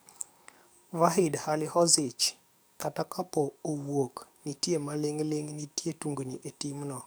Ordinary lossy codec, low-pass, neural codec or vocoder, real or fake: none; none; codec, 44.1 kHz, 7.8 kbps, DAC; fake